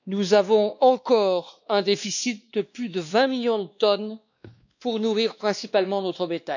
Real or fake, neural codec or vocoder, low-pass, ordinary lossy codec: fake; codec, 16 kHz, 2 kbps, X-Codec, WavLM features, trained on Multilingual LibriSpeech; 7.2 kHz; none